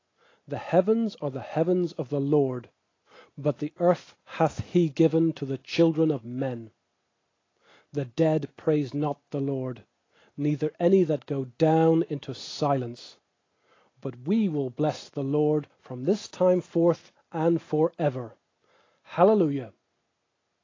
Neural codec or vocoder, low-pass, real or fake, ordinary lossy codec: none; 7.2 kHz; real; AAC, 32 kbps